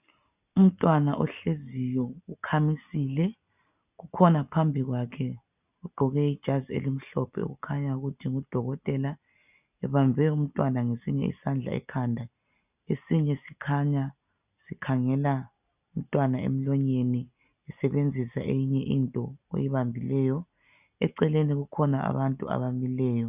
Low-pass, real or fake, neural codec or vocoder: 3.6 kHz; real; none